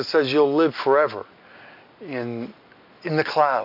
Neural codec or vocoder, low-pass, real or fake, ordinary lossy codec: none; 5.4 kHz; real; MP3, 48 kbps